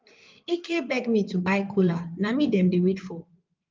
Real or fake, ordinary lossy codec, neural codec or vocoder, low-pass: fake; Opus, 24 kbps; codec, 16 kHz in and 24 kHz out, 2.2 kbps, FireRedTTS-2 codec; 7.2 kHz